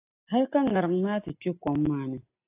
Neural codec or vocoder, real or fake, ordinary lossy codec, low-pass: none; real; AAC, 24 kbps; 3.6 kHz